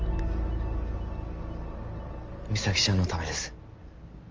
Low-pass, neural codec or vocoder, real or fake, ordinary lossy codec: 7.2 kHz; none; real; Opus, 24 kbps